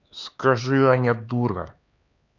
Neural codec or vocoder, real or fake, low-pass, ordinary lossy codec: codec, 16 kHz, 4 kbps, X-Codec, HuBERT features, trained on LibriSpeech; fake; 7.2 kHz; none